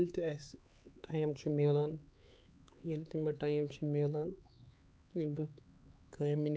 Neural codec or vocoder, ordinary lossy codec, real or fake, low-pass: codec, 16 kHz, 4 kbps, X-Codec, HuBERT features, trained on LibriSpeech; none; fake; none